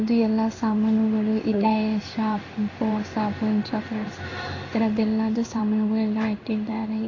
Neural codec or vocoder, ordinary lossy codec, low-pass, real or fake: codec, 16 kHz in and 24 kHz out, 1 kbps, XY-Tokenizer; AAC, 32 kbps; 7.2 kHz; fake